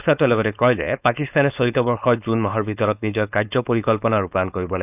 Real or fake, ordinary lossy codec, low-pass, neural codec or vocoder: fake; none; 3.6 kHz; codec, 16 kHz, 4.8 kbps, FACodec